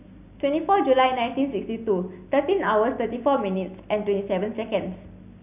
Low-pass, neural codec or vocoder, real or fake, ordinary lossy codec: 3.6 kHz; none; real; none